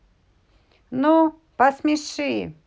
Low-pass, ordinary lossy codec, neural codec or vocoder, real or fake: none; none; none; real